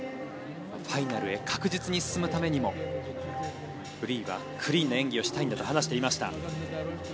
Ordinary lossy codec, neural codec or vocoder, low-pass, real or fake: none; none; none; real